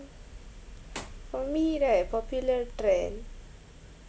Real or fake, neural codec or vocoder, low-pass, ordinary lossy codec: real; none; none; none